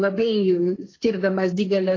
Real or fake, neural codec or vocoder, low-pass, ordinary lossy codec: fake; codec, 16 kHz, 1.1 kbps, Voila-Tokenizer; 7.2 kHz; AAC, 32 kbps